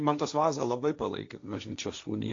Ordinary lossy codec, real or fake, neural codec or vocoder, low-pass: AAC, 48 kbps; fake; codec, 16 kHz, 1.1 kbps, Voila-Tokenizer; 7.2 kHz